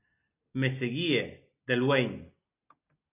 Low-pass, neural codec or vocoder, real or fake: 3.6 kHz; none; real